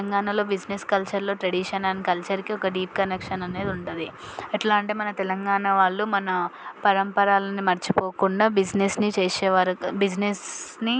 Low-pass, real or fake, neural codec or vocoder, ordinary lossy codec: none; real; none; none